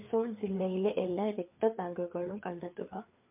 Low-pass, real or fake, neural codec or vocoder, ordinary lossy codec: 3.6 kHz; fake; codec, 16 kHz, 4 kbps, FreqCodec, larger model; MP3, 32 kbps